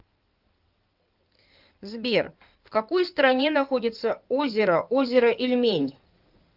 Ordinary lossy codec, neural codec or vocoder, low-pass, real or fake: Opus, 24 kbps; codec, 16 kHz in and 24 kHz out, 2.2 kbps, FireRedTTS-2 codec; 5.4 kHz; fake